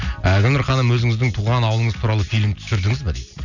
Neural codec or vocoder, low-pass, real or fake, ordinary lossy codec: none; 7.2 kHz; real; none